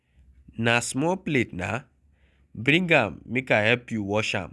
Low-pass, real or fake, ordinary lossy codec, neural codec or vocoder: none; real; none; none